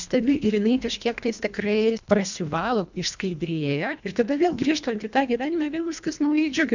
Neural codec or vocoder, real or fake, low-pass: codec, 24 kHz, 1.5 kbps, HILCodec; fake; 7.2 kHz